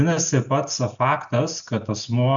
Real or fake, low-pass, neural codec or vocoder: real; 7.2 kHz; none